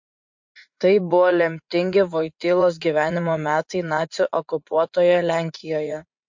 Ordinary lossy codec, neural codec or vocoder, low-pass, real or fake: MP3, 48 kbps; vocoder, 44.1 kHz, 80 mel bands, Vocos; 7.2 kHz; fake